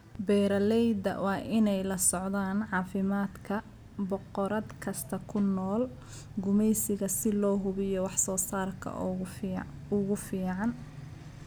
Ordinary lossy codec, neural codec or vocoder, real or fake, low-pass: none; none; real; none